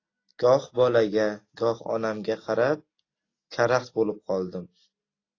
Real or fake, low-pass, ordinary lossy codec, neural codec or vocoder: real; 7.2 kHz; AAC, 32 kbps; none